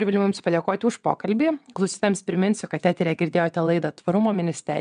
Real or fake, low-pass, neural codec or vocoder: fake; 9.9 kHz; vocoder, 22.05 kHz, 80 mel bands, WaveNeXt